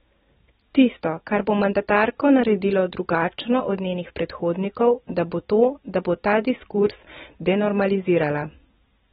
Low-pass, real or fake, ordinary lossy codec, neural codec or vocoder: 19.8 kHz; real; AAC, 16 kbps; none